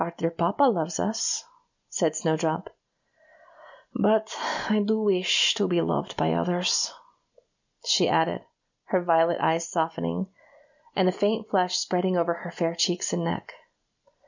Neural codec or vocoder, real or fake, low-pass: none; real; 7.2 kHz